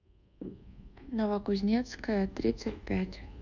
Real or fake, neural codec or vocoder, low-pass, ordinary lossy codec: fake; codec, 24 kHz, 1.2 kbps, DualCodec; 7.2 kHz; none